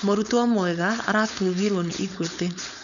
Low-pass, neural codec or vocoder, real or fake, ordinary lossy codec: 7.2 kHz; codec, 16 kHz, 4.8 kbps, FACodec; fake; none